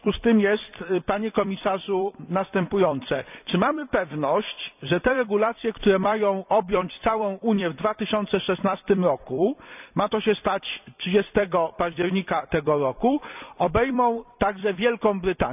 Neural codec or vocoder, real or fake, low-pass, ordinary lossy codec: vocoder, 44.1 kHz, 128 mel bands every 256 samples, BigVGAN v2; fake; 3.6 kHz; none